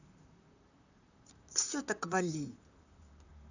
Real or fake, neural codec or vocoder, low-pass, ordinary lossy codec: fake; vocoder, 44.1 kHz, 128 mel bands, Pupu-Vocoder; 7.2 kHz; none